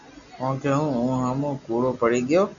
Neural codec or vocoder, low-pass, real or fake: none; 7.2 kHz; real